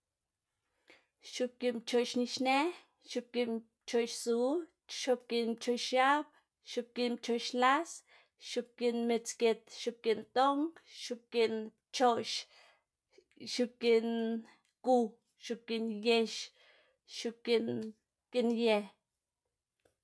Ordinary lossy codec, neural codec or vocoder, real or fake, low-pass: none; none; real; none